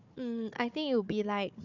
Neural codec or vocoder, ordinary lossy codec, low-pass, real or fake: codec, 16 kHz, 4 kbps, FunCodec, trained on Chinese and English, 50 frames a second; none; 7.2 kHz; fake